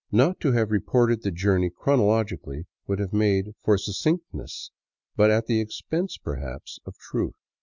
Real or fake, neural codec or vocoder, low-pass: real; none; 7.2 kHz